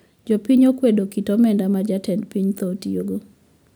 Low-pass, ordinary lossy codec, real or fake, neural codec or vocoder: none; none; real; none